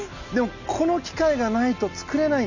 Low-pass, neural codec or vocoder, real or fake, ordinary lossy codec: 7.2 kHz; none; real; none